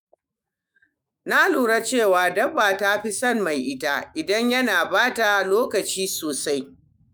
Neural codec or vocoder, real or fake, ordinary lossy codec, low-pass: autoencoder, 48 kHz, 128 numbers a frame, DAC-VAE, trained on Japanese speech; fake; none; none